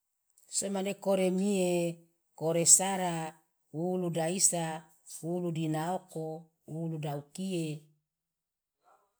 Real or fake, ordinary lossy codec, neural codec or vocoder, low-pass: real; none; none; none